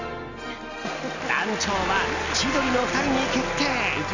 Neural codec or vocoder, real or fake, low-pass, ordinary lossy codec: none; real; 7.2 kHz; none